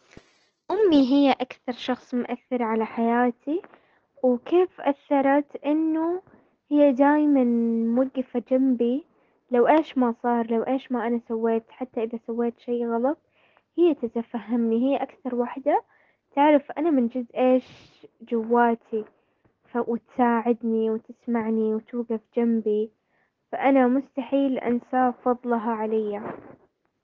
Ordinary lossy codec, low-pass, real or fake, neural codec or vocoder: Opus, 16 kbps; 7.2 kHz; real; none